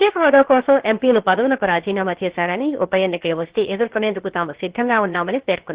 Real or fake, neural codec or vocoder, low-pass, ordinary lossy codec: fake; codec, 16 kHz, 0.7 kbps, FocalCodec; 3.6 kHz; Opus, 16 kbps